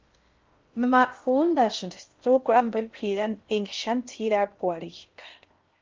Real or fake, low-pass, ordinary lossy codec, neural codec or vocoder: fake; 7.2 kHz; Opus, 32 kbps; codec, 16 kHz in and 24 kHz out, 0.6 kbps, FocalCodec, streaming, 4096 codes